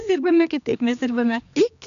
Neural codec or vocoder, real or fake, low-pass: codec, 16 kHz, 2 kbps, X-Codec, HuBERT features, trained on general audio; fake; 7.2 kHz